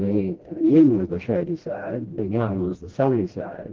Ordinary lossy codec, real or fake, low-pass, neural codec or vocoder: Opus, 16 kbps; fake; 7.2 kHz; codec, 16 kHz, 1 kbps, FreqCodec, smaller model